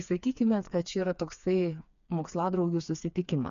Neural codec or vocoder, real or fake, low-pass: codec, 16 kHz, 4 kbps, FreqCodec, smaller model; fake; 7.2 kHz